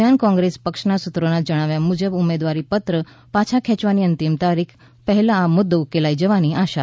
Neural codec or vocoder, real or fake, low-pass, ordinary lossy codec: none; real; none; none